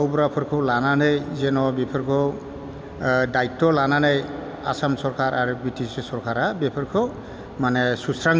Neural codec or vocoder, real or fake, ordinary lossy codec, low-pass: none; real; none; none